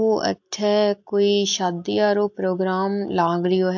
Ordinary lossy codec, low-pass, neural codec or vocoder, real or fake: AAC, 48 kbps; 7.2 kHz; none; real